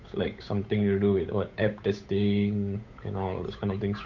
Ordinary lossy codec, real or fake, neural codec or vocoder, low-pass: MP3, 48 kbps; fake; codec, 16 kHz, 8 kbps, FunCodec, trained on Chinese and English, 25 frames a second; 7.2 kHz